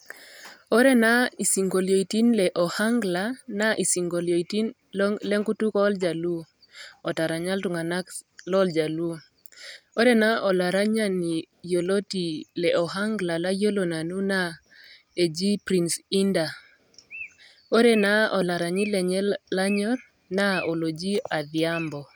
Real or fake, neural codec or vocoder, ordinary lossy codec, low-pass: real; none; none; none